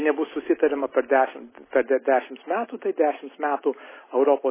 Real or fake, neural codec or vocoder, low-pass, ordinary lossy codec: real; none; 3.6 kHz; MP3, 16 kbps